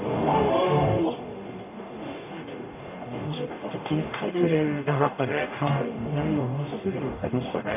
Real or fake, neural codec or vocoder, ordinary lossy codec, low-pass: fake; codec, 44.1 kHz, 0.9 kbps, DAC; none; 3.6 kHz